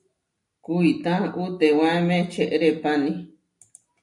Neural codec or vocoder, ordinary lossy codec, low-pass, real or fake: none; MP3, 96 kbps; 10.8 kHz; real